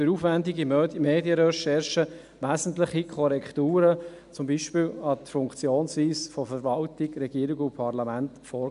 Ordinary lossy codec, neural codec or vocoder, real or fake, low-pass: MP3, 96 kbps; none; real; 10.8 kHz